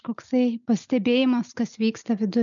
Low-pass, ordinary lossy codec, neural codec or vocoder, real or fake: 7.2 kHz; MP3, 96 kbps; none; real